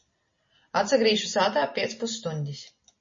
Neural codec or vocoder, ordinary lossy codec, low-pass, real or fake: none; MP3, 32 kbps; 7.2 kHz; real